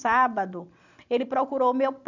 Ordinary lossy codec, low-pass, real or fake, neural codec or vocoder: none; 7.2 kHz; real; none